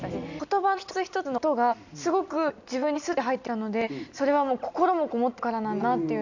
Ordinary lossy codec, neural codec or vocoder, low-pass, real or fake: none; none; 7.2 kHz; real